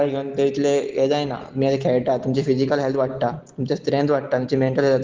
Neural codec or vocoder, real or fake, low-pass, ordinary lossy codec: none; real; 7.2 kHz; Opus, 16 kbps